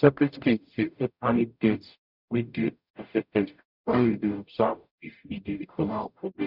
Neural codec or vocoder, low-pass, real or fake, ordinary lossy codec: codec, 44.1 kHz, 0.9 kbps, DAC; 5.4 kHz; fake; none